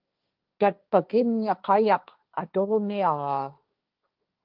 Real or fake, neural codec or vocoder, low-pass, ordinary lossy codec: fake; codec, 16 kHz, 1.1 kbps, Voila-Tokenizer; 5.4 kHz; Opus, 24 kbps